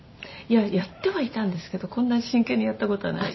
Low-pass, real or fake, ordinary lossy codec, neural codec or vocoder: 7.2 kHz; real; MP3, 24 kbps; none